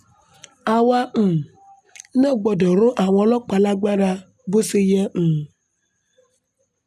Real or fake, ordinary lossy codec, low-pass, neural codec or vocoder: real; none; 14.4 kHz; none